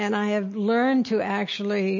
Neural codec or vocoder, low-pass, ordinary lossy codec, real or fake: none; 7.2 kHz; MP3, 32 kbps; real